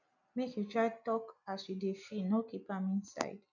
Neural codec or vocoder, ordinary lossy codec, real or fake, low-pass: none; none; real; 7.2 kHz